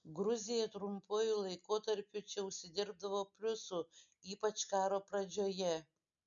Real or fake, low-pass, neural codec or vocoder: real; 7.2 kHz; none